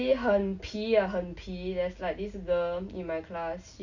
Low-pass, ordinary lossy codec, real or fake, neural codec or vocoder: 7.2 kHz; none; real; none